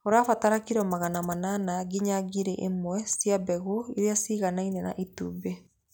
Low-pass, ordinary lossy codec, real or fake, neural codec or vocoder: none; none; real; none